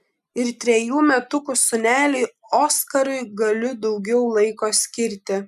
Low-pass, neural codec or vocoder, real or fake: 14.4 kHz; none; real